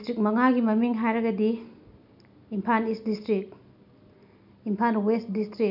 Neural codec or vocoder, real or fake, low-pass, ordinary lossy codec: none; real; 5.4 kHz; none